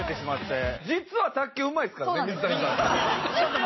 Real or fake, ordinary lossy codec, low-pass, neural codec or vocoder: real; MP3, 24 kbps; 7.2 kHz; none